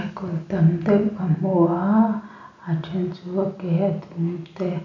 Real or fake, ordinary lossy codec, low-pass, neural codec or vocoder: real; none; 7.2 kHz; none